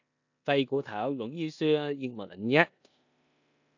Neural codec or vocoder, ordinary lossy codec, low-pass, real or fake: codec, 16 kHz in and 24 kHz out, 0.9 kbps, LongCat-Audio-Codec, four codebook decoder; AAC, 48 kbps; 7.2 kHz; fake